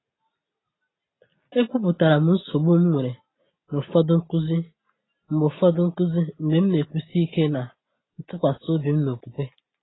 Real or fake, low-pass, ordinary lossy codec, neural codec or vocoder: real; 7.2 kHz; AAC, 16 kbps; none